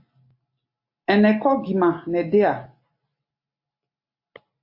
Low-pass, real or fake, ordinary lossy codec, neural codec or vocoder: 5.4 kHz; real; MP3, 32 kbps; none